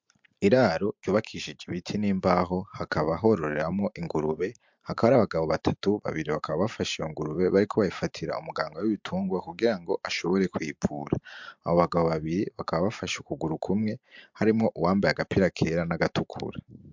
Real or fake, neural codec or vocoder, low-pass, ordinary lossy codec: fake; vocoder, 44.1 kHz, 128 mel bands every 512 samples, BigVGAN v2; 7.2 kHz; MP3, 64 kbps